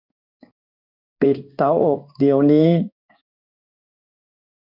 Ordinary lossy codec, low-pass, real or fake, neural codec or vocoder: none; 5.4 kHz; fake; codec, 16 kHz in and 24 kHz out, 1 kbps, XY-Tokenizer